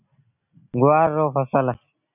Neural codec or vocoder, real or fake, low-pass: none; real; 3.6 kHz